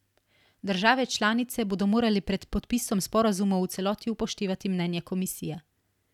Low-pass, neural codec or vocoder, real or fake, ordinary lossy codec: 19.8 kHz; none; real; none